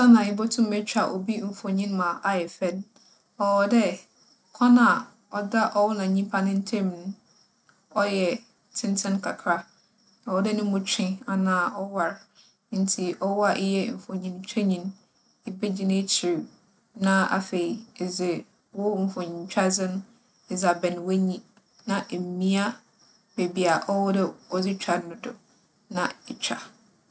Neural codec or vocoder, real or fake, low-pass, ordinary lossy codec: none; real; none; none